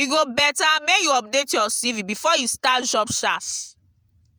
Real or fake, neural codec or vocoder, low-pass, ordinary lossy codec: fake; vocoder, 48 kHz, 128 mel bands, Vocos; none; none